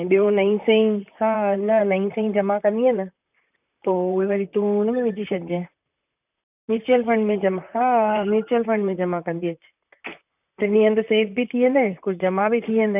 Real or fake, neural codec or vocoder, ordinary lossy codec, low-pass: fake; vocoder, 44.1 kHz, 128 mel bands, Pupu-Vocoder; none; 3.6 kHz